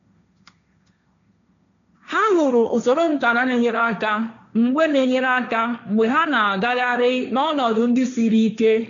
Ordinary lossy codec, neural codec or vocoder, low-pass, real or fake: none; codec, 16 kHz, 1.1 kbps, Voila-Tokenizer; 7.2 kHz; fake